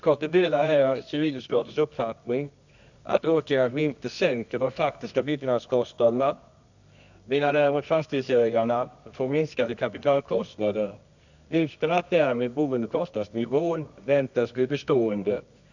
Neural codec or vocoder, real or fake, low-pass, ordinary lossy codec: codec, 24 kHz, 0.9 kbps, WavTokenizer, medium music audio release; fake; 7.2 kHz; none